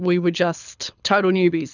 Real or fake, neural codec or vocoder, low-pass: fake; codec, 24 kHz, 6 kbps, HILCodec; 7.2 kHz